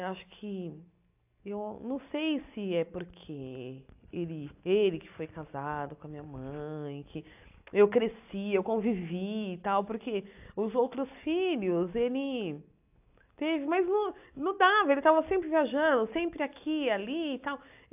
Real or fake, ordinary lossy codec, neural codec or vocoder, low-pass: real; AAC, 32 kbps; none; 3.6 kHz